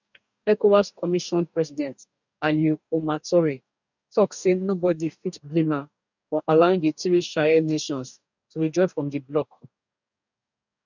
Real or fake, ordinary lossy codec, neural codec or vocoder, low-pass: fake; none; codec, 44.1 kHz, 2.6 kbps, DAC; 7.2 kHz